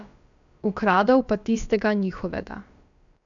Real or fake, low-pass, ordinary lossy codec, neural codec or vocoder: fake; 7.2 kHz; none; codec, 16 kHz, about 1 kbps, DyCAST, with the encoder's durations